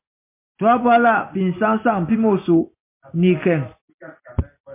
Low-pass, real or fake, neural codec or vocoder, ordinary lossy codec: 3.6 kHz; real; none; MP3, 24 kbps